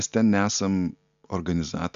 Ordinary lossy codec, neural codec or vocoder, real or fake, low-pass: AAC, 96 kbps; none; real; 7.2 kHz